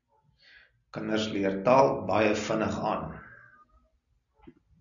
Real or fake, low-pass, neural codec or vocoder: real; 7.2 kHz; none